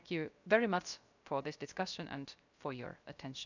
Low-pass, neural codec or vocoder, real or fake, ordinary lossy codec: 7.2 kHz; codec, 16 kHz, 0.3 kbps, FocalCodec; fake; none